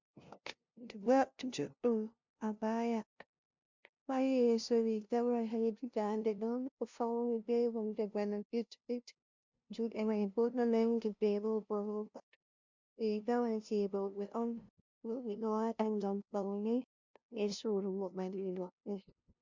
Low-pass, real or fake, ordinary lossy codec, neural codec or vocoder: 7.2 kHz; fake; MP3, 64 kbps; codec, 16 kHz, 0.5 kbps, FunCodec, trained on LibriTTS, 25 frames a second